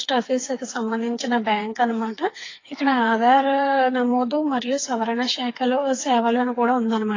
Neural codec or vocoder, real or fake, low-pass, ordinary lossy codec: codec, 16 kHz, 4 kbps, FreqCodec, smaller model; fake; 7.2 kHz; AAC, 32 kbps